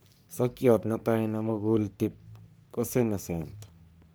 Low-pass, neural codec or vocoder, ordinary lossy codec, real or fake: none; codec, 44.1 kHz, 3.4 kbps, Pupu-Codec; none; fake